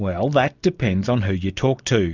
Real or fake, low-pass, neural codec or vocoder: real; 7.2 kHz; none